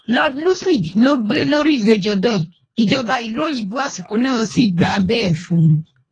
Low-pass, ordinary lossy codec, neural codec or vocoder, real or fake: 9.9 kHz; AAC, 32 kbps; codec, 24 kHz, 1.5 kbps, HILCodec; fake